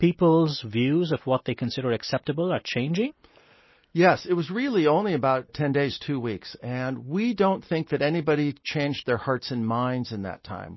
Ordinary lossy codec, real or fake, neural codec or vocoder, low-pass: MP3, 24 kbps; real; none; 7.2 kHz